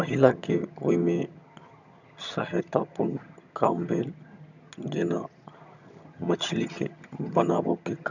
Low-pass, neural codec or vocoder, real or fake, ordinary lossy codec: 7.2 kHz; vocoder, 22.05 kHz, 80 mel bands, HiFi-GAN; fake; none